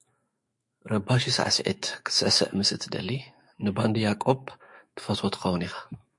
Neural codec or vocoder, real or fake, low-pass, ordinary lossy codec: autoencoder, 48 kHz, 128 numbers a frame, DAC-VAE, trained on Japanese speech; fake; 10.8 kHz; MP3, 48 kbps